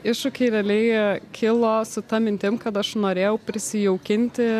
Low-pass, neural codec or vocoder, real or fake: 14.4 kHz; none; real